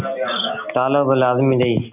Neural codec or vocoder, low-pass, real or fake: none; 3.6 kHz; real